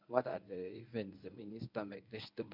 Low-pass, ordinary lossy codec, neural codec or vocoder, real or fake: 5.4 kHz; MP3, 48 kbps; codec, 24 kHz, 0.9 kbps, WavTokenizer, medium speech release version 1; fake